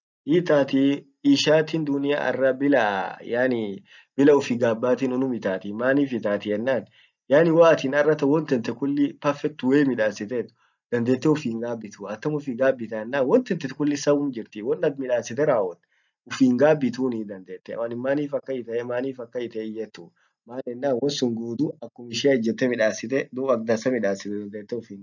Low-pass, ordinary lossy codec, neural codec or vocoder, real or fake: 7.2 kHz; none; none; real